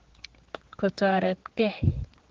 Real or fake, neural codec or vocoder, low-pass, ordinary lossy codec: fake; codec, 16 kHz, 4 kbps, X-Codec, HuBERT features, trained on general audio; 7.2 kHz; Opus, 16 kbps